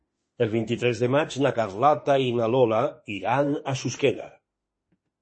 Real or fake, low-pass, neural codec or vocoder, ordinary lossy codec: fake; 9.9 kHz; autoencoder, 48 kHz, 32 numbers a frame, DAC-VAE, trained on Japanese speech; MP3, 32 kbps